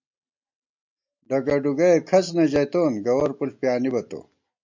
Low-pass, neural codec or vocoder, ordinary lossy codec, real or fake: 7.2 kHz; none; MP3, 48 kbps; real